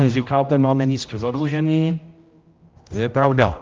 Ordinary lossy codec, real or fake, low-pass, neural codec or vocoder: Opus, 24 kbps; fake; 7.2 kHz; codec, 16 kHz, 0.5 kbps, X-Codec, HuBERT features, trained on general audio